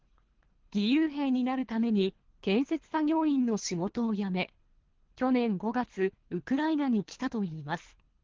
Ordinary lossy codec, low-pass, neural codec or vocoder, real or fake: Opus, 16 kbps; 7.2 kHz; codec, 24 kHz, 3 kbps, HILCodec; fake